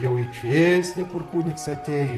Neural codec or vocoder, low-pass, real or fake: vocoder, 44.1 kHz, 128 mel bands, Pupu-Vocoder; 14.4 kHz; fake